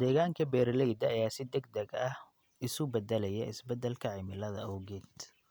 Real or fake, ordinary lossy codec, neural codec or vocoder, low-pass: real; none; none; none